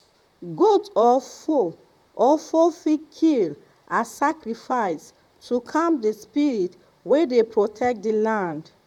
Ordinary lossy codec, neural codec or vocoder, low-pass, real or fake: none; vocoder, 44.1 kHz, 128 mel bands, Pupu-Vocoder; 19.8 kHz; fake